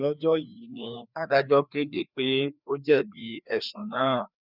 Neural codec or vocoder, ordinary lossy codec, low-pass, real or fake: codec, 16 kHz, 2 kbps, FreqCodec, larger model; none; 5.4 kHz; fake